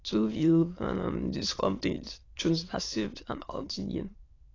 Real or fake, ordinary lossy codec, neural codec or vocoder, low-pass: fake; AAC, 32 kbps; autoencoder, 22.05 kHz, a latent of 192 numbers a frame, VITS, trained on many speakers; 7.2 kHz